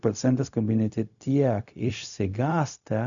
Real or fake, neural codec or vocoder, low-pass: fake; codec, 16 kHz, 0.4 kbps, LongCat-Audio-Codec; 7.2 kHz